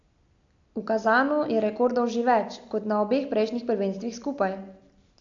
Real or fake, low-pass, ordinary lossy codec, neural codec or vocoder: real; 7.2 kHz; AAC, 48 kbps; none